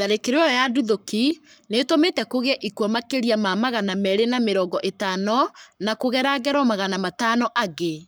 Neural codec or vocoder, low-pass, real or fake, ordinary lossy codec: codec, 44.1 kHz, 7.8 kbps, Pupu-Codec; none; fake; none